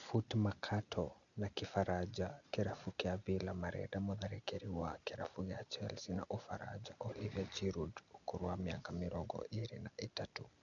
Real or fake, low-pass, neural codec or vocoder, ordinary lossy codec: real; 7.2 kHz; none; none